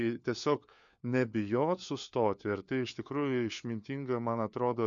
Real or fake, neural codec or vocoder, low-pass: fake; codec, 16 kHz, 4 kbps, FunCodec, trained on LibriTTS, 50 frames a second; 7.2 kHz